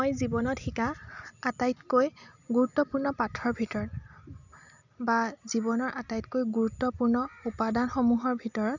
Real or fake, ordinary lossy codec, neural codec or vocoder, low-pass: real; none; none; 7.2 kHz